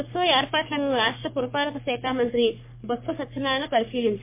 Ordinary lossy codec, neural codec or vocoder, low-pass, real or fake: MP3, 24 kbps; codec, 44.1 kHz, 3.4 kbps, Pupu-Codec; 3.6 kHz; fake